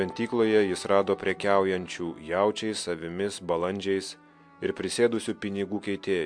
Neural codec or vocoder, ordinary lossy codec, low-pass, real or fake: none; MP3, 64 kbps; 9.9 kHz; real